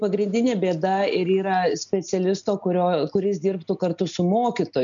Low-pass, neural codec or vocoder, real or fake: 7.2 kHz; none; real